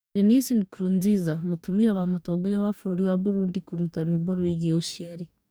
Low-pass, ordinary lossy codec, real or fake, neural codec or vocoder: none; none; fake; codec, 44.1 kHz, 2.6 kbps, DAC